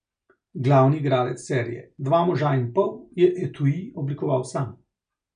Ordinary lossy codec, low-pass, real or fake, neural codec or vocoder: none; 9.9 kHz; real; none